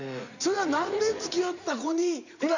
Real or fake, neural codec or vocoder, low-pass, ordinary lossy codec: fake; vocoder, 44.1 kHz, 80 mel bands, Vocos; 7.2 kHz; none